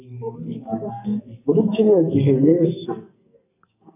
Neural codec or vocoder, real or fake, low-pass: codec, 44.1 kHz, 2.6 kbps, SNAC; fake; 3.6 kHz